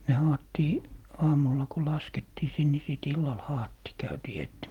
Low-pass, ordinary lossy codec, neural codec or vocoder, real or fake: 19.8 kHz; Opus, 24 kbps; none; real